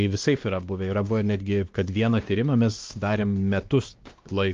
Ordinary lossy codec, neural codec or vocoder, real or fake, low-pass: Opus, 32 kbps; codec, 16 kHz, 2 kbps, X-Codec, WavLM features, trained on Multilingual LibriSpeech; fake; 7.2 kHz